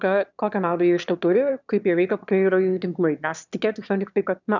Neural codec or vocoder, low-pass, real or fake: autoencoder, 22.05 kHz, a latent of 192 numbers a frame, VITS, trained on one speaker; 7.2 kHz; fake